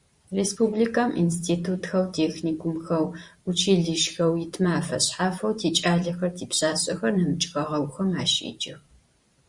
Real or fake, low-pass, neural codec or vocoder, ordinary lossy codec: fake; 10.8 kHz; vocoder, 44.1 kHz, 128 mel bands every 512 samples, BigVGAN v2; Opus, 64 kbps